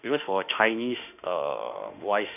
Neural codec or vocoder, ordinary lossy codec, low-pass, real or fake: autoencoder, 48 kHz, 32 numbers a frame, DAC-VAE, trained on Japanese speech; none; 3.6 kHz; fake